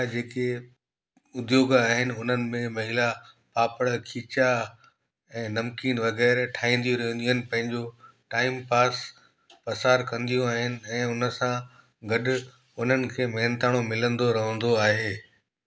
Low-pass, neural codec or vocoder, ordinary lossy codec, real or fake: none; none; none; real